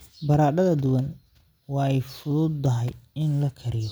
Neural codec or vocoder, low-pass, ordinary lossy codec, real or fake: none; none; none; real